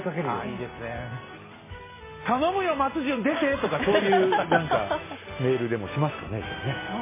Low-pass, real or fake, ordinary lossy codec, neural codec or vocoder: 3.6 kHz; real; MP3, 16 kbps; none